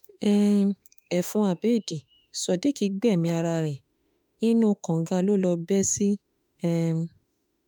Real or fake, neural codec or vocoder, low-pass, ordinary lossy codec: fake; autoencoder, 48 kHz, 32 numbers a frame, DAC-VAE, trained on Japanese speech; 19.8 kHz; MP3, 96 kbps